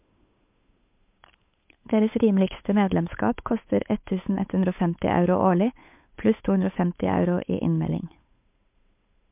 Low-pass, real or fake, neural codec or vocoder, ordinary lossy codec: 3.6 kHz; fake; codec, 16 kHz, 8 kbps, FunCodec, trained on Chinese and English, 25 frames a second; MP3, 32 kbps